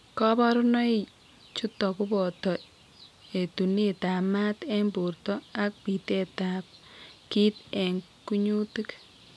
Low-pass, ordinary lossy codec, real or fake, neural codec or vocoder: none; none; real; none